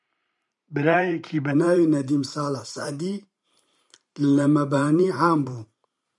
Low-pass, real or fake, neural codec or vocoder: 10.8 kHz; fake; vocoder, 44.1 kHz, 128 mel bands every 512 samples, BigVGAN v2